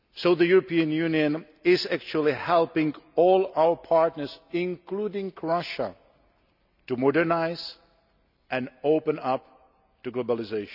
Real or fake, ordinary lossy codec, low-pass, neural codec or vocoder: real; none; 5.4 kHz; none